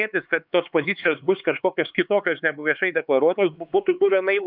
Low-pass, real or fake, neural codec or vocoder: 5.4 kHz; fake; codec, 16 kHz, 4 kbps, X-Codec, HuBERT features, trained on LibriSpeech